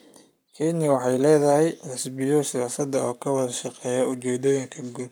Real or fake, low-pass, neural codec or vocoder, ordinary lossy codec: fake; none; codec, 44.1 kHz, 7.8 kbps, Pupu-Codec; none